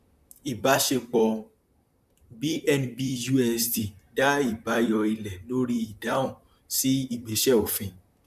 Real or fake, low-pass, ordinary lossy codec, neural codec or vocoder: fake; 14.4 kHz; none; vocoder, 44.1 kHz, 128 mel bands, Pupu-Vocoder